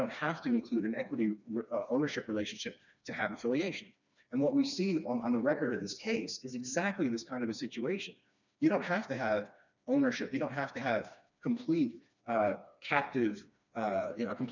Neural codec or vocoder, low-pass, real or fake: codec, 16 kHz, 2 kbps, FreqCodec, smaller model; 7.2 kHz; fake